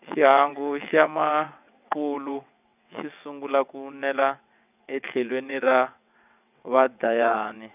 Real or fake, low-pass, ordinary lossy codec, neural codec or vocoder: fake; 3.6 kHz; none; vocoder, 22.05 kHz, 80 mel bands, WaveNeXt